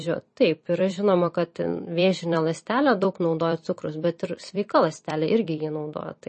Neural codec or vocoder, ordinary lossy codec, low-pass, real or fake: vocoder, 44.1 kHz, 128 mel bands every 256 samples, BigVGAN v2; MP3, 32 kbps; 10.8 kHz; fake